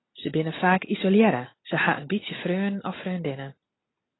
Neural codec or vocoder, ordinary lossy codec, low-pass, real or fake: none; AAC, 16 kbps; 7.2 kHz; real